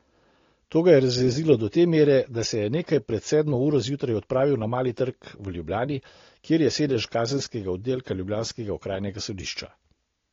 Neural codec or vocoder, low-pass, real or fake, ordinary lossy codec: none; 7.2 kHz; real; AAC, 32 kbps